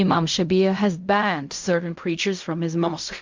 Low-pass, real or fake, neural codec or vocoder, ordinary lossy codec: 7.2 kHz; fake; codec, 16 kHz in and 24 kHz out, 0.4 kbps, LongCat-Audio-Codec, fine tuned four codebook decoder; MP3, 64 kbps